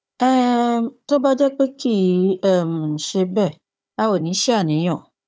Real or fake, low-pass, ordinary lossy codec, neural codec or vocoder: fake; none; none; codec, 16 kHz, 4 kbps, FunCodec, trained on Chinese and English, 50 frames a second